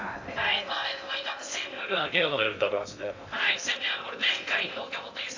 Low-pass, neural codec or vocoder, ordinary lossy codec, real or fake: 7.2 kHz; codec, 16 kHz in and 24 kHz out, 0.6 kbps, FocalCodec, streaming, 2048 codes; AAC, 48 kbps; fake